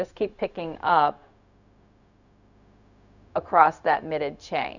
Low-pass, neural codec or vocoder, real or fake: 7.2 kHz; codec, 16 kHz, 0.4 kbps, LongCat-Audio-Codec; fake